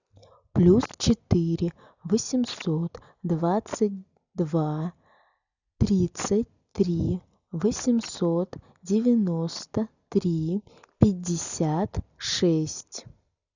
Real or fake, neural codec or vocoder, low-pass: real; none; 7.2 kHz